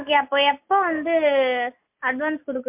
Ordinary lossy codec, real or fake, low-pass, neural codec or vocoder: MP3, 32 kbps; real; 3.6 kHz; none